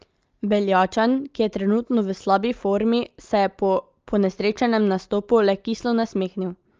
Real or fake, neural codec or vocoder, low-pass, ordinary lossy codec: real; none; 7.2 kHz; Opus, 24 kbps